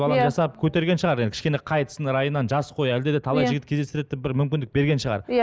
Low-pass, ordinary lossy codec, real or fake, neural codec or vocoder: none; none; real; none